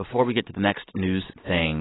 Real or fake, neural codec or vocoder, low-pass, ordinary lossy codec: real; none; 7.2 kHz; AAC, 16 kbps